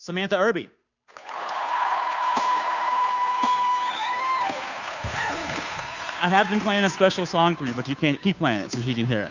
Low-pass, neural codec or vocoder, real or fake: 7.2 kHz; codec, 16 kHz, 2 kbps, FunCodec, trained on Chinese and English, 25 frames a second; fake